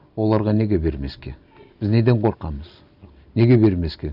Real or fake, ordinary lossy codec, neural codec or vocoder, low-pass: real; none; none; 5.4 kHz